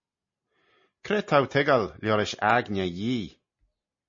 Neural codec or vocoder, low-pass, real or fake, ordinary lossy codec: none; 7.2 kHz; real; MP3, 32 kbps